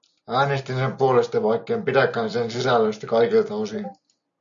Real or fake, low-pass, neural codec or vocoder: real; 7.2 kHz; none